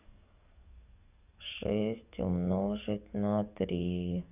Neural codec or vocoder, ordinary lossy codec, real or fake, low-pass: codec, 44.1 kHz, 7.8 kbps, DAC; none; fake; 3.6 kHz